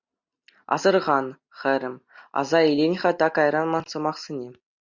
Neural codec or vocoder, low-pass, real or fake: none; 7.2 kHz; real